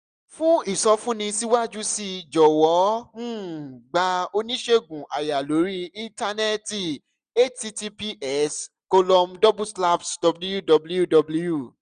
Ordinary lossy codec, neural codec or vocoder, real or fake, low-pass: none; none; real; 9.9 kHz